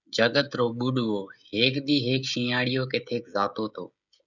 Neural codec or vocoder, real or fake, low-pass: codec, 16 kHz, 16 kbps, FreqCodec, smaller model; fake; 7.2 kHz